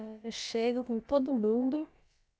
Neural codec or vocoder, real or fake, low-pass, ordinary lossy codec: codec, 16 kHz, about 1 kbps, DyCAST, with the encoder's durations; fake; none; none